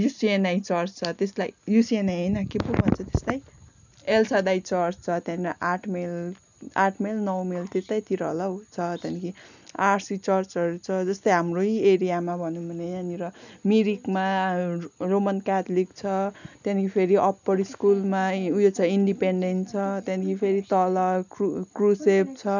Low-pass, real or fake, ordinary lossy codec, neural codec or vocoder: 7.2 kHz; real; none; none